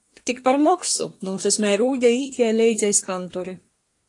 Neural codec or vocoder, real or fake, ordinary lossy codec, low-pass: codec, 24 kHz, 1 kbps, SNAC; fake; AAC, 48 kbps; 10.8 kHz